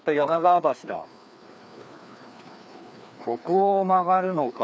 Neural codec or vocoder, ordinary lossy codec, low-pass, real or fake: codec, 16 kHz, 2 kbps, FreqCodec, larger model; none; none; fake